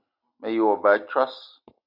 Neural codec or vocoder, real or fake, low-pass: none; real; 5.4 kHz